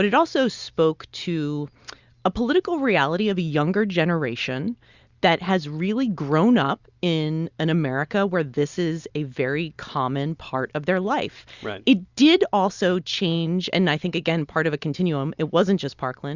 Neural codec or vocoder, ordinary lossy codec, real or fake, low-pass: none; Opus, 64 kbps; real; 7.2 kHz